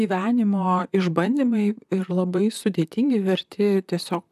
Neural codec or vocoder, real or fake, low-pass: vocoder, 44.1 kHz, 128 mel bands, Pupu-Vocoder; fake; 14.4 kHz